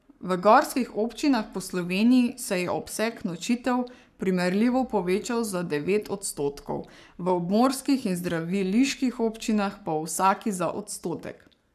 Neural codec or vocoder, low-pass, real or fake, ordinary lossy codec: codec, 44.1 kHz, 7.8 kbps, Pupu-Codec; 14.4 kHz; fake; none